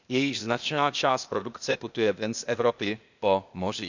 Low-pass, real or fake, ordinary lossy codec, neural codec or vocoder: 7.2 kHz; fake; none; codec, 16 kHz, 0.8 kbps, ZipCodec